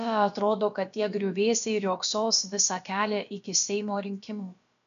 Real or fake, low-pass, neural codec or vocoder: fake; 7.2 kHz; codec, 16 kHz, about 1 kbps, DyCAST, with the encoder's durations